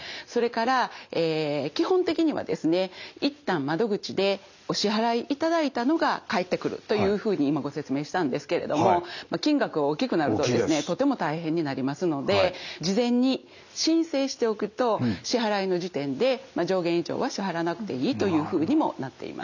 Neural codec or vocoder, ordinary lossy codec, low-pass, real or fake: none; none; 7.2 kHz; real